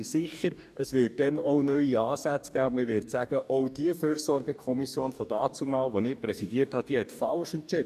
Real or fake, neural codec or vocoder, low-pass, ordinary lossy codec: fake; codec, 44.1 kHz, 2.6 kbps, DAC; 14.4 kHz; none